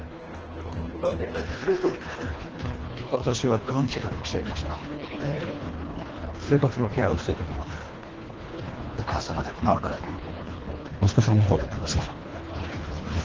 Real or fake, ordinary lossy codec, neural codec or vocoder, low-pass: fake; Opus, 16 kbps; codec, 24 kHz, 1.5 kbps, HILCodec; 7.2 kHz